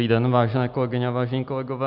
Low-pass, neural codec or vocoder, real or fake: 5.4 kHz; none; real